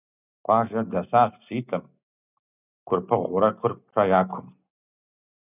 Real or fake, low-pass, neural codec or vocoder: real; 3.6 kHz; none